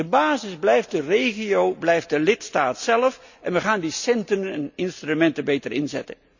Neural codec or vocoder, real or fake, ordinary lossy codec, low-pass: none; real; none; 7.2 kHz